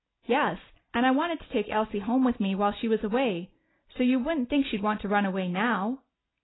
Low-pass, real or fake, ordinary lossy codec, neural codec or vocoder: 7.2 kHz; real; AAC, 16 kbps; none